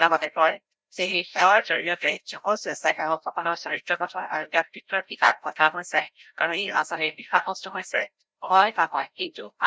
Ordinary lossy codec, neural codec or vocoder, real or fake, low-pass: none; codec, 16 kHz, 0.5 kbps, FreqCodec, larger model; fake; none